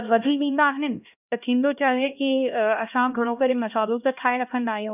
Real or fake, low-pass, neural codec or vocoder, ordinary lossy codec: fake; 3.6 kHz; codec, 16 kHz, 1 kbps, X-Codec, HuBERT features, trained on LibriSpeech; none